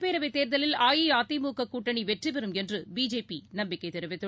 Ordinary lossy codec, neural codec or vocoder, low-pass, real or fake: none; none; none; real